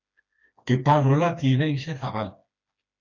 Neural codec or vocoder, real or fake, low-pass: codec, 16 kHz, 2 kbps, FreqCodec, smaller model; fake; 7.2 kHz